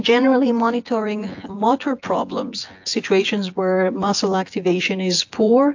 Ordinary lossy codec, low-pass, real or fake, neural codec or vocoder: AAC, 48 kbps; 7.2 kHz; fake; vocoder, 24 kHz, 100 mel bands, Vocos